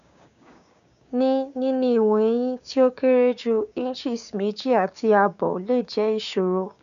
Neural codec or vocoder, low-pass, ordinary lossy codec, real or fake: codec, 16 kHz, 6 kbps, DAC; 7.2 kHz; none; fake